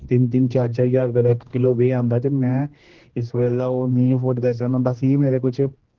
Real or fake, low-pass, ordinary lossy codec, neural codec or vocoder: fake; 7.2 kHz; Opus, 16 kbps; codec, 16 kHz, 1 kbps, X-Codec, HuBERT features, trained on general audio